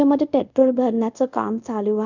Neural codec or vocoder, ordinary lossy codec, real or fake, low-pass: codec, 24 kHz, 0.5 kbps, DualCodec; none; fake; 7.2 kHz